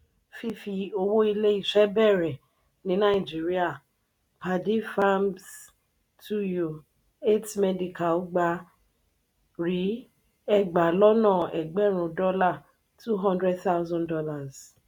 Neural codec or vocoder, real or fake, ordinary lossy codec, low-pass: none; real; none; 19.8 kHz